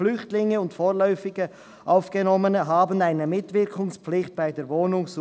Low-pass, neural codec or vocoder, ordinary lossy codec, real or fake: none; none; none; real